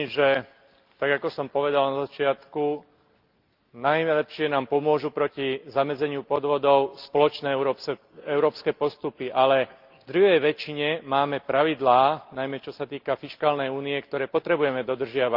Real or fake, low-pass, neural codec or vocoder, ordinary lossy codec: real; 5.4 kHz; none; Opus, 32 kbps